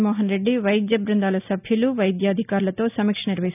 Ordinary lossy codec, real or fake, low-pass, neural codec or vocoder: none; real; 3.6 kHz; none